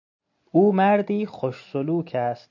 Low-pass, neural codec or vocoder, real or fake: 7.2 kHz; none; real